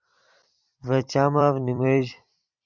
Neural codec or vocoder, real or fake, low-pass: vocoder, 44.1 kHz, 128 mel bands every 256 samples, BigVGAN v2; fake; 7.2 kHz